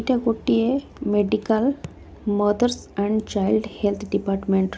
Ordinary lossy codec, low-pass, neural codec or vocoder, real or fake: none; none; none; real